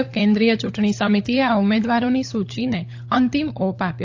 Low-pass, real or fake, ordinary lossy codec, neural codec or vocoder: 7.2 kHz; fake; AAC, 48 kbps; codec, 16 kHz, 16 kbps, FunCodec, trained on LibriTTS, 50 frames a second